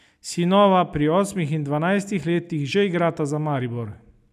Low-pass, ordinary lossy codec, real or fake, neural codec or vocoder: 14.4 kHz; none; real; none